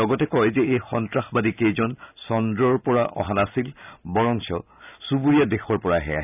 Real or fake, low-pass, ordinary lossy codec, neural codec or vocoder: real; 3.6 kHz; none; none